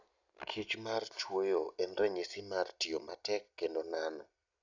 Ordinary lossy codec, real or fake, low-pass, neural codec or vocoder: none; real; 7.2 kHz; none